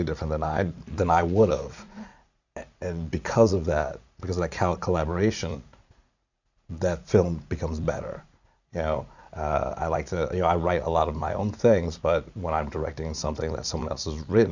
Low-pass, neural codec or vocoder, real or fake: 7.2 kHz; vocoder, 44.1 kHz, 80 mel bands, Vocos; fake